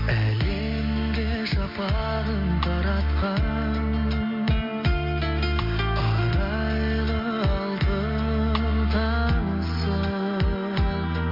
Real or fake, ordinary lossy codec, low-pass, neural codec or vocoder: real; MP3, 32 kbps; 5.4 kHz; none